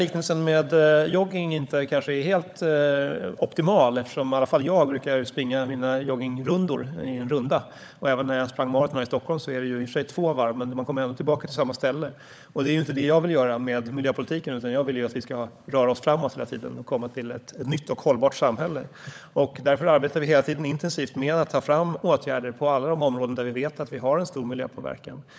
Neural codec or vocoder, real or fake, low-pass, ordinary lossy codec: codec, 16 kHz, 16 kbps, FunCodec, trained on LibriTTS, 50 frames a second; fake; none; none